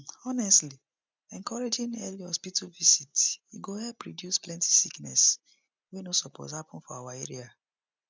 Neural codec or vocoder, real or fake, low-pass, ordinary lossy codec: none; real; none; none